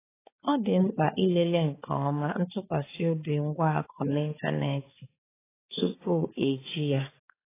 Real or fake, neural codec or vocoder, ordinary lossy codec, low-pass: fake; codec, 16 kHz, 4 kbps, X-Codec, HuBERT features, trained on balanced general audio; AAC, 16 kbps; 3.6 kHz